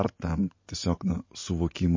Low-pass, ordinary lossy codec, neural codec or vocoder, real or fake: 7.2 kHz; MP3, 32 kbps; none; real